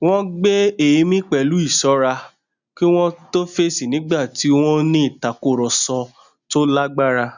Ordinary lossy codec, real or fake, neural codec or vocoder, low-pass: none; real; none; 7.2 kHz